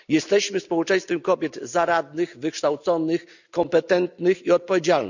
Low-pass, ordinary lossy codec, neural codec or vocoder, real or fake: 7.2 kHz; none; none; real